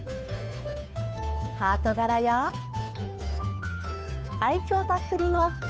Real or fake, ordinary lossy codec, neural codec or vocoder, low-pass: fake; none; codec, 16 kHz, 2 kbps, FunCodec, trained on Chinese and English, 25 frames a second; none